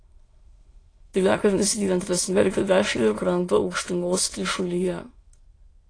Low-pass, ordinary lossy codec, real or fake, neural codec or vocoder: 9.9 kHz; AAC, 32 kbps; fake; autoencoder, 22.05 kHz, a latent of 192 numbers a frame, VITS, trained on many speakers